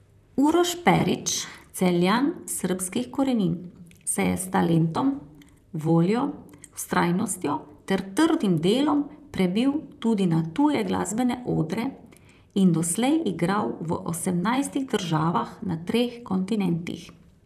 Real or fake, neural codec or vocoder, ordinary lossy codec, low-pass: fake; vocoder, 44.1 kHz, 128 mel bands, Pupu-Vocoder; none; 14.4 kHz